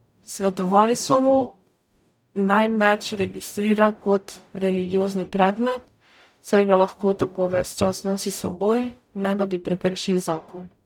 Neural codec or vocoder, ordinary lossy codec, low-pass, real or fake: codec, 44.1 kHz, 0.9 kbps, DAC; none; 19.8 kHz; fake